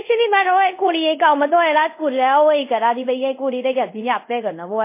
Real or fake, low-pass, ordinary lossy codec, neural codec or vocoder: fake; 3.6 kHz; MP3, 24 kbps; codec, 24 kHz, 0.5 kbps, DualCodec